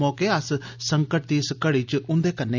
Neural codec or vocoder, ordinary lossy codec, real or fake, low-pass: none; none; real; 7.2 kHz